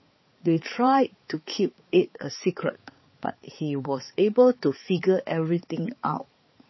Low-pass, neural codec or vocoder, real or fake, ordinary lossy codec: 7.2 kHz; codec, 16 kHz, 4 kbps, X-Codec, HuBERT features, trained on balanced general audio; fake; MP3, 24 kbps